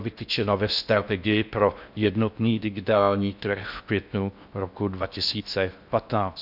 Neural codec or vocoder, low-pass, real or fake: codec, 16 kHz in and 24 kHz out, 0.6 kbps, FocalCodec, streaming, 4096 codes; 5.4 kHz; fake